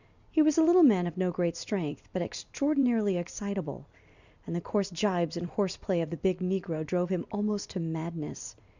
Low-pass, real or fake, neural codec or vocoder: 7.2 kHz; fake; vocoder, 44.1 kHz, 128 mel bands every 256 samples, BigVGAN v2